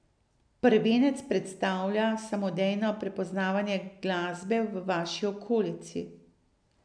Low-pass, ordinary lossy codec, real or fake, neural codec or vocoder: 9.9 kHz; none; real; none